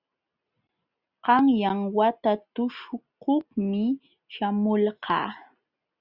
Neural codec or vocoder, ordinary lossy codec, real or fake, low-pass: none; Opus, 64 kbps; real; 5.4 kHz